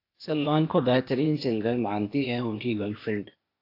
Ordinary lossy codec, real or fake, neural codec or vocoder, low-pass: AAC, 32 kbps; fake; codec, 16 kHz, 0.8 kbps, ZipCodec; 5.4 kHz